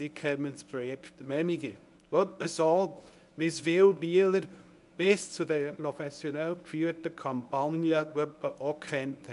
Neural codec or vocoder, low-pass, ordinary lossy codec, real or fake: codec, 24 kHz, 0.9 kbps, WavTokenizer, medium speech release version 1; 10.8 kHz; none; fake